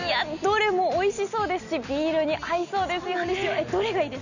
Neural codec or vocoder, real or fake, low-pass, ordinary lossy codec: none; real; 7.2 kHz; none